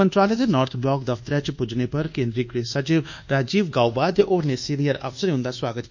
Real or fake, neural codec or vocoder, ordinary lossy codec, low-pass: fake; codec, 24 kHz, 1.2 kbps, DualCodec; none; 7.2 kHz